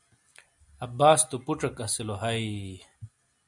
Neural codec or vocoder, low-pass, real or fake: none; 10.8 kHz; real